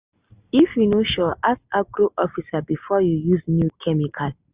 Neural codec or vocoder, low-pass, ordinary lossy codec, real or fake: none; 3.6 kHz; Opus, 64 kbps; real